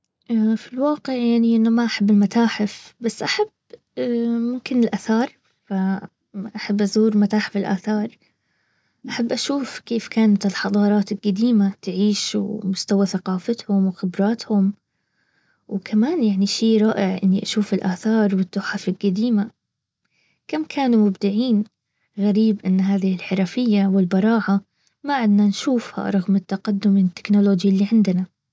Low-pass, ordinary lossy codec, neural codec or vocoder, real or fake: none; none; none; real